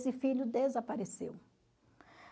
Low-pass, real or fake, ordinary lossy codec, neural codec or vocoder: none; real; none; none